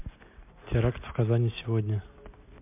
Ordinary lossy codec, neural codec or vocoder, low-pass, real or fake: MP3, 32 kbps; none; 3.6 kHz; real